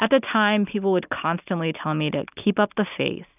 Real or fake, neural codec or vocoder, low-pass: real; none; 3.6 kHz